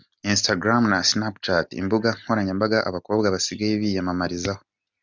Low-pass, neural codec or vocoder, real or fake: 7.2 kHz; none; real